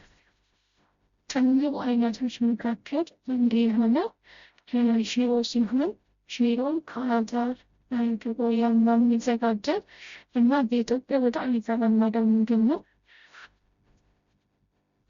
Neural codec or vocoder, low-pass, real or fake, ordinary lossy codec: codec, 16 kHz, 0.5 kbps, FreqCodec, smaller model; 7.2 kHz; fake; Opus, 64 kbps